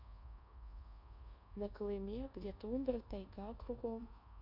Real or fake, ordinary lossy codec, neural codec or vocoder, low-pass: fake; none; codec, 24 kHz, 1.2 kbps, DualCodec; 5.4 kHz